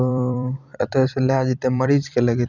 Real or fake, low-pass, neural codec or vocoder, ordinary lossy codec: fake; 7.2 kHz; vocoder, 44.1 kHz, 128 mel bands every 256 samples, BigVGAN v2; none